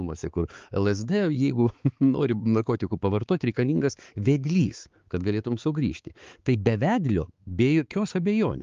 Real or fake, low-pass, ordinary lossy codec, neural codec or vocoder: fake; 7.2 kHz; Opus, 32 kbps; codec, 16 kHz, 4 kbps, X-Codec, HuBERT features, trained on balanced general audio